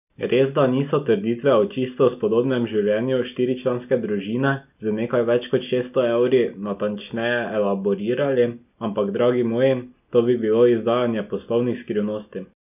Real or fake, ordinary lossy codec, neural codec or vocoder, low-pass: real; none; none; 3.6 kHz